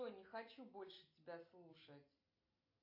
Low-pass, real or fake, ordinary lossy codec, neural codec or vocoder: 5.4 kHz; real; AAC, 32 kbps; none